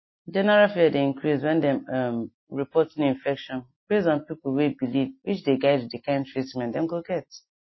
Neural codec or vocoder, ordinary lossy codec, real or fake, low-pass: none; MP3, 24 kbps; real; 7.2 kHz